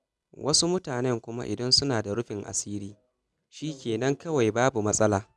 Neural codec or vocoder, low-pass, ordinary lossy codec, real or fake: none; none; none; real